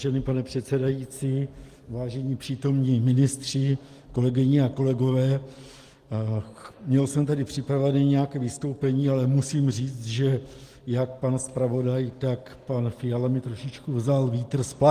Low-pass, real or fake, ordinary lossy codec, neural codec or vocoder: 14.4 kHz; real; Opus, 24 kbps; none